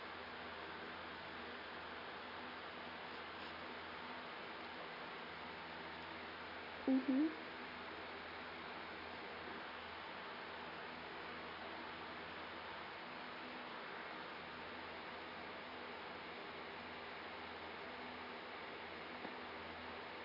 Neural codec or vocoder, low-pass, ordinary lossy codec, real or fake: none; 5.4 kHz; none; real